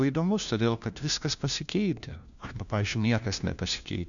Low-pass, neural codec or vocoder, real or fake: 7.2 kHz; codec, 16 kHz, 1 kbps, FunCodec, trained on LibriTTS, 50 frames a second; fake